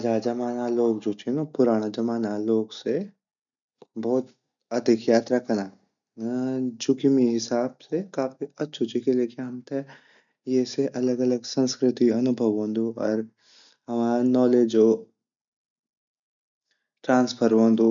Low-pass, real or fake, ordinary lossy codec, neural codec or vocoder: 7.2 kHz; real; none; none